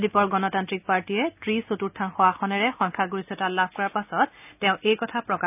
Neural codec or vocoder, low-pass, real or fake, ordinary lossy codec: none; 3.6 kHz; real; none